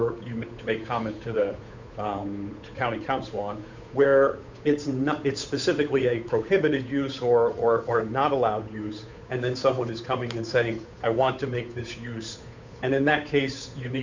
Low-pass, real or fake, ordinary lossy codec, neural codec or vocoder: 7.2 kHz; fake; MP3, 64 kbps; codec, 16 kHz, 8 kbps, FunCodec, trained on Chinese and English, 25 frames a second